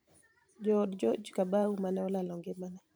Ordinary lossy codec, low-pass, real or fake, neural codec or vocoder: none; none; fake; vocoder, 44.1 kHz, 128 mel bands every 512 samples, BigVGAN v2